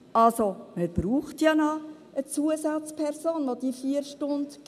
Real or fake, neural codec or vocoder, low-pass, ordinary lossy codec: real; none; 14.4 kHz; none